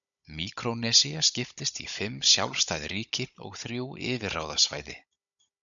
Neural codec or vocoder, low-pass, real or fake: codec, 16 kHz, 16 kbps, FunCodec, trained on Chinese and English, 50 frames a second; 7.2 kHz; fake